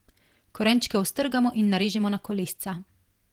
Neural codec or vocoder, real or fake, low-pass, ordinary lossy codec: vocoder, 48 kHz, 128 mel bands, Vocos; fake; 19.8 kHz; Opus, 24 kbps